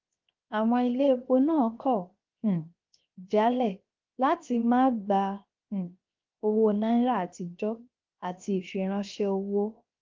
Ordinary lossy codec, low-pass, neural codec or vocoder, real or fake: Opus, 24 kbps; 7.2 kHz; codec, 16 kHz, 0.7 kbps, FocalCodec; fake